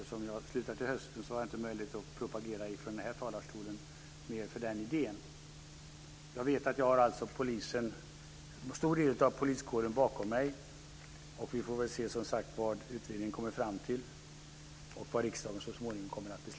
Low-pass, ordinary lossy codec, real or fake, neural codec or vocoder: none; none; real; none